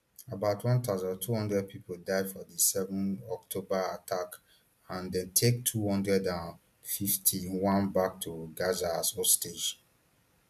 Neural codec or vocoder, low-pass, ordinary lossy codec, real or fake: none; 14.4 kHz; AAC, 96 kbps; real